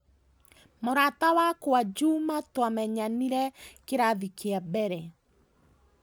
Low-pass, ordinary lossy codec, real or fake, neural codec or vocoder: none; none; real; none